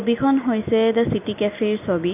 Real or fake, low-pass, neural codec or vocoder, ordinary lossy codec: real; 3.6 kHz; none; none